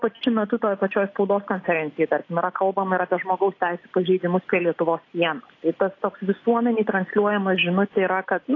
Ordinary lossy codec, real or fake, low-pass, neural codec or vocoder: AAC, 32 kbps; real; 7.2 kHz; none